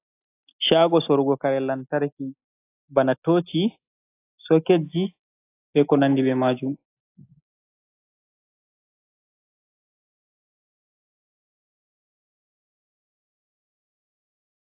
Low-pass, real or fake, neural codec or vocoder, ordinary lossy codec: 3.6 kHz; real; none; AAC, 24 kbps